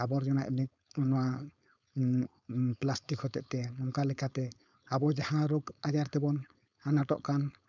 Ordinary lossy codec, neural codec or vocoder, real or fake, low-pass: none; codec, 16 kHz, 4.8 kbps, FACodec; fake; 7.2 kHz